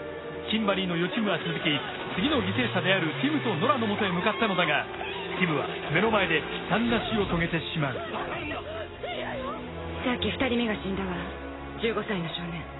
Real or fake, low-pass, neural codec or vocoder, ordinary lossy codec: real; 7.2 kHz; none; AAC, 16 kbps